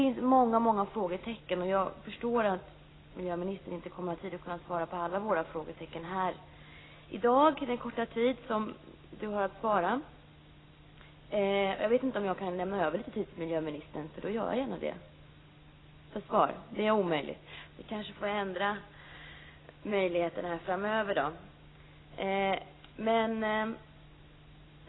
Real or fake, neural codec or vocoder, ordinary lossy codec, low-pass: real; none; AAC, 16 kbps; 7.2 kHz